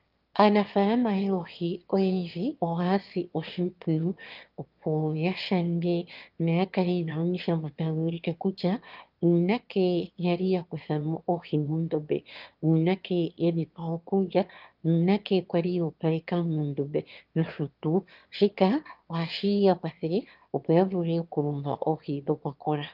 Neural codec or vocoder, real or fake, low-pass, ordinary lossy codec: autoencoder, 22.05 kHz, a latent of 192 numbers a frame, VITS, trained on one speaker; fake; 5.4 kHz; Opus, 32 kbps